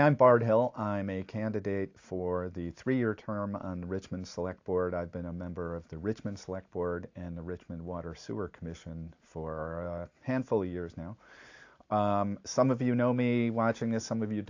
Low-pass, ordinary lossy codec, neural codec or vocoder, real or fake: 7.2 kHz; AAC, 48 kbps; none; real